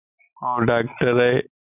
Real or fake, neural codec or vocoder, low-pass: real; none; 3.6 kHz